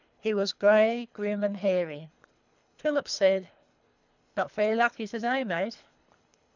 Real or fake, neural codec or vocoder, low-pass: fake; codec, 24 kHz, 3 kbps, HILCodec; 7.2 kHz